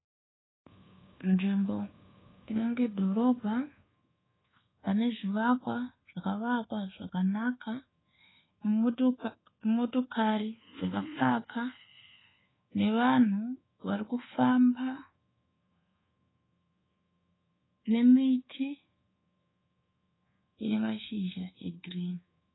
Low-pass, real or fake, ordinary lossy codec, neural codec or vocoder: 7.2 kHz; fake; AAC, 16 kbps; codec, 24 kHz, 1.2 kbps, DualCodec